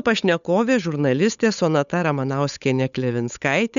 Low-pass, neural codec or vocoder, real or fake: 7.2 kHz; codec, 16 kHz, 8 kbps, FunCodec, trained on Chinese and English, 25 frames a second; fake